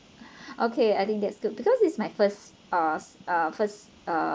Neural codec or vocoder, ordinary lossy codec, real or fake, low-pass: none; none; real; none